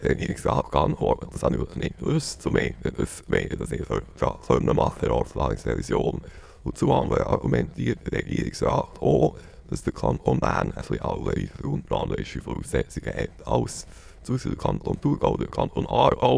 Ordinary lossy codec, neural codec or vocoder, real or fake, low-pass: none; autoencoder, 22.05 kHz, a latent of 192 numbers a frame, VITS, trained on many speakers; fake; none